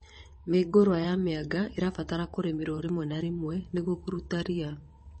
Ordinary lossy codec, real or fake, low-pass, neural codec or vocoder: MP3, 32 kbps; fake; 9.9 kHz; vocoder, 22.05 kHz, 80 mel bands, WaveNeXt